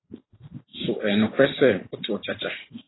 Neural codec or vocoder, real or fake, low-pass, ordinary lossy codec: vocoder, 44.1 kHz, 128 mel bands every 512 samples, BigVGAN v2; fake; 7.2 kHz; AAC, 16 kbps